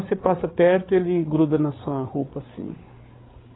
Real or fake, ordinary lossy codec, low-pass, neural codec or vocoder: fake; AAC, 16 kbps; 7.2 kHz; codec, 16 kHz, 8 kbps, FreqCodec, larger model